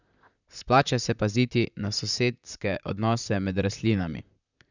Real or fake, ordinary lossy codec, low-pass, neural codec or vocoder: fake; none; 7.2 kHz; vocoder, 44.1 kHz, 128 mel bands, Pupu-Vocoder